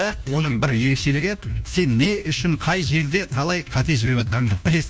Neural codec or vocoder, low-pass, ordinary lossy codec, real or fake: codec, 16 kHz, 1 kbps, FunCodec, trained on LibriTTS, 50 frames a second; none; none; fake